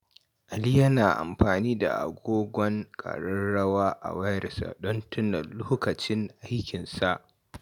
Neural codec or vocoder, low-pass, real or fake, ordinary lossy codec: none; 19.8 kHz; real; none